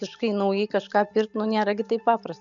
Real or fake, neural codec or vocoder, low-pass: real; none; 7.2 kHz